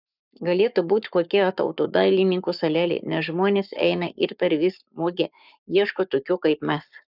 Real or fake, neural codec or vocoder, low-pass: fake; codec, 44.1 kHz, 7.8 kbps, Pupu-Codec; 5.4 kHz